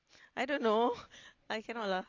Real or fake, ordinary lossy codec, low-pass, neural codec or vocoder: real; AAC, 32 kbps; 7.2 kHz; none